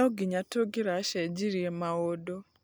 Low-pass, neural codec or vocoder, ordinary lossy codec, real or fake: none; none; none; real